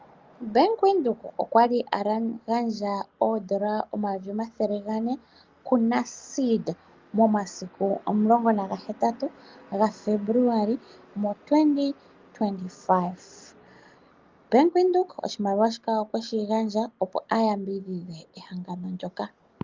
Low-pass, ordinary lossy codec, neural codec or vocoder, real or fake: 7.2 kHz; Opus, 24 kbps; none; real